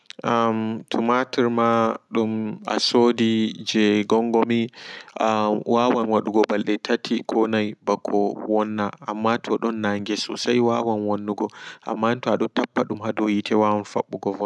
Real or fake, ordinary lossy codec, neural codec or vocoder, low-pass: real; none; none; none